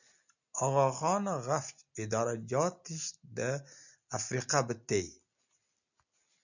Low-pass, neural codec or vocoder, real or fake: 7.2 kHz; none; real